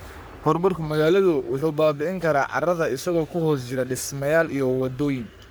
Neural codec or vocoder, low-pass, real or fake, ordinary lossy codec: codec, 44.1 kHz, 3.4 kbps, Pupu-Codec; none; fake; none